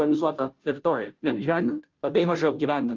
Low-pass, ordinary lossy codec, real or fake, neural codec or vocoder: 7.2 kHz; Opus, 32 kbps; fake; codec, 16 kHz, 0.5 kbps, FunCodec, trained on Chinese and English, 25 frames a second